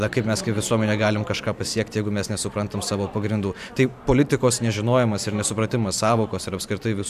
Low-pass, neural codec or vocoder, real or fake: 14.4 kHz; vocoder, 44.1 kHz, 128 mel bands every 512 samples, BigVGAN v2; fake